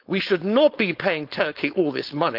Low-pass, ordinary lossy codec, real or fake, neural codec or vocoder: 5.4 kHz; Opus, 24 kbps; fake; codec, 16 kHz, 4.8 kbps, FACodec